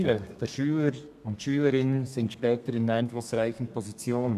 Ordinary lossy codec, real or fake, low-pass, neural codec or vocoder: none; fake; 14.4 kHz; codec, 32 kHz, 1.9 kbps, SNAC